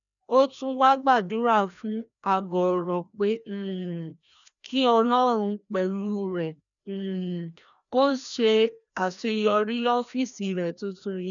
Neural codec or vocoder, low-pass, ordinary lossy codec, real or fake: codec, 16 kHz, 1 kbps, FreqCodec, larger model; 7.2 kHz; none; fake